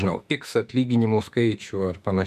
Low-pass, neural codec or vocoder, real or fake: 14.4 kHz; autoencoder, 48 kHz, 32 numbers a frame, DAC-VAE, trained on Japanese speech; fake